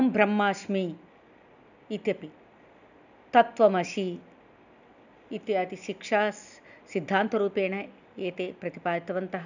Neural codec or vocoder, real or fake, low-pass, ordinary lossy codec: none; real; 7.2 kHz; none